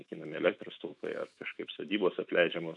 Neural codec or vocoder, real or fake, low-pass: autoencoder, 48 kHz, 128 numbers a frame, DAC-VAE, trained on Japanese speech; fake; 10.8 kHz